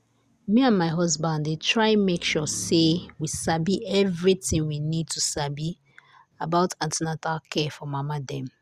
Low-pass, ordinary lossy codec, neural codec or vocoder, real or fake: 14.4 kHz; Opus, 64 kbps; none; real